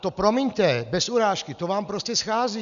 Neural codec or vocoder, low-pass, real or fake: none; 7.2 kHz; real